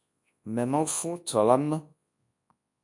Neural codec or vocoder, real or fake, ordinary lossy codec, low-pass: codec, 24 kHz, 0.9 kbps, WavTokenizer, large speech release; fake; AAC, 64 kbps; 10.8 kHz